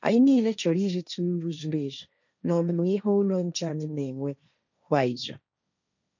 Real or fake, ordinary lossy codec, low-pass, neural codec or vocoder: fake; none; 7.2 kHz; codec, 16 kHz, 1.1 kbps, Voila-Tokenizer